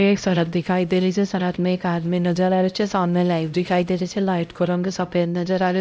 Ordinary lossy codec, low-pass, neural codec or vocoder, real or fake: none; none; codec, 16 kHz, 0.5 kbps, X-Codec, HuBERT features, trained on LibriSpeech; fake